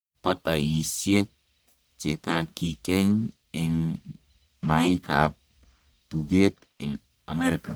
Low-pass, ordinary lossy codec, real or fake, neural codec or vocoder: none; none; fake; codec, 44.1 kHz, 1.7 kbps, Pupu-Codec